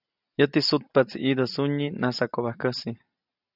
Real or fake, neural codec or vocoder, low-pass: real; none; 5.4 kHz